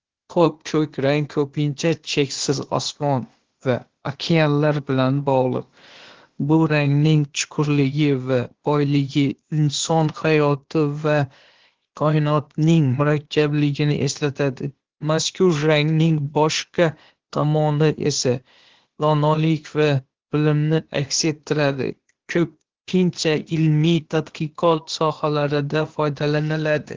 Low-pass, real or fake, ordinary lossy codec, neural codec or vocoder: 7.2 kHz; fake; Opus, 16 kbps; codec, 16 kHz, 0.8 kbps, ZipCodec